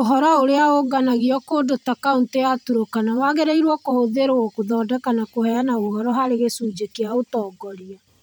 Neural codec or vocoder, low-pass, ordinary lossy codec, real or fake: vocoder, 44.1 kHz, 128 mel bands every 512 samples, BigVGAN v2; none; none; fake